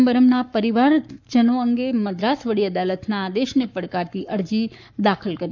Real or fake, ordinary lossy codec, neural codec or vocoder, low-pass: fake; none; codec, 44.1 kHz, 7.8 kbps, Pupu-Codec; 7.2 kHz